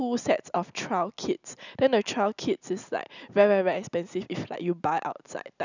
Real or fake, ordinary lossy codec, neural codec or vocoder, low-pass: real; none; none; 7.2 kHz